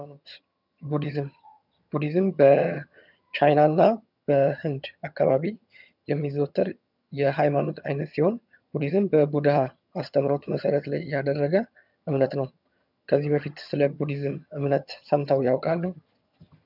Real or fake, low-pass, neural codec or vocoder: fake; 5.4 kHz; vocoder, 22.05 kHz, 80 mel bands, HiFi-GAN